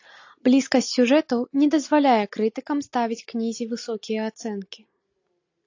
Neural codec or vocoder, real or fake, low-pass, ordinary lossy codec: none; real; 7.2 kHz; AAC, 48 kbps